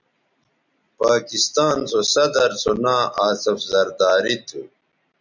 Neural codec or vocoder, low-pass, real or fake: none; 7.2 kHz; real